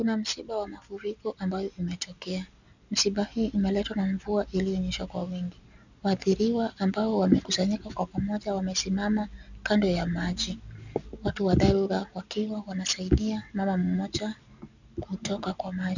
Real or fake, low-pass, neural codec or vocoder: real; 7.2 kHz; none